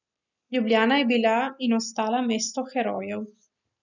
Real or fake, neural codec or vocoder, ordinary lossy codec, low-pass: real; none; none; 7.2 kHz